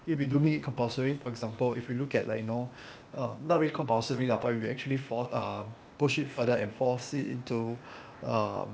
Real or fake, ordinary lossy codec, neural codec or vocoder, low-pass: fake; none; codec, 16 kHz, 0.8 kbps, ZipCodec; none